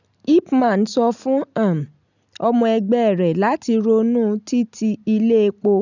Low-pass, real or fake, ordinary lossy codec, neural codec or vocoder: 7.2 kHz; real; none; none